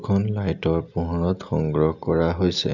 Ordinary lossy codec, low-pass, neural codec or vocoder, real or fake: none; 7.2 kHz; none; real